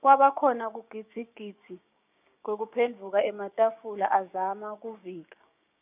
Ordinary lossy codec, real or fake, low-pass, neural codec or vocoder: none; fake; 3.6 kHz; codec, 16 kHz, 6 kbps, DAC